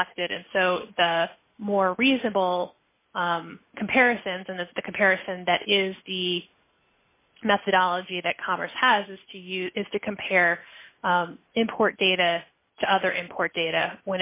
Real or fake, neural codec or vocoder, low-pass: real; none; 3.6 kHz